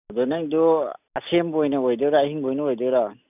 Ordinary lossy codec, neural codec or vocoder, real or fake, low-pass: none; none; real; 3.6 kHz